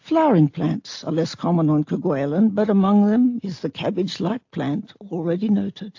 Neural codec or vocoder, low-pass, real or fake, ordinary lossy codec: none; 7.2 kHz; real; AAC, 48 kbps